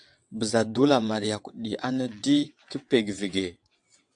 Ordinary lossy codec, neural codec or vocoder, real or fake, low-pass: AAC, 64 kbps; vocoder, 22.05 kHz, 80 mel bands, WaveNeXt; fake; 9.9 kHz